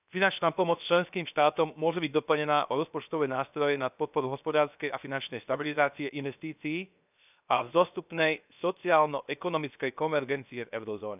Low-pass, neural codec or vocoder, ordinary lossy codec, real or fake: 3.6 kHz; codec, 16 kHz, 0.7 kbps, FocalCodec; none; fake